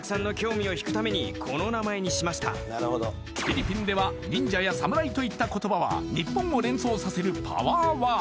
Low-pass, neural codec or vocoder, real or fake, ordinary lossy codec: none; none; real; none